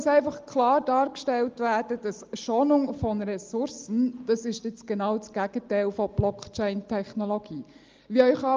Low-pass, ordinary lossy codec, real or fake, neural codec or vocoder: 7.2 kHz; Opus, 24 kbps; real; none